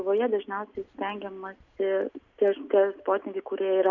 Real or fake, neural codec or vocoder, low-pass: real; none; 7.2 kHz